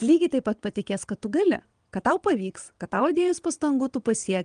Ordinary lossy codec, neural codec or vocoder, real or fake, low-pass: Opus, 32 kbps; vocoder, 22.05 kHz, 80 mel bands, WaveNeXt; fake; 9.9 kHz